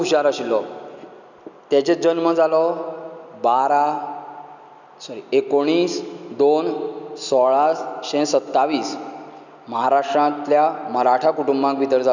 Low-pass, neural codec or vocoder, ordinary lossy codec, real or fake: 7.2 kHz; none; none; real